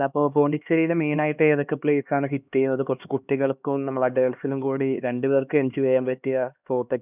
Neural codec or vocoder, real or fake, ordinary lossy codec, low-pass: codec, 16 kHz, 2 kbps, X-Codec, HuBERT features, trained on LibriSpeech; fake; none; 3.6 kHz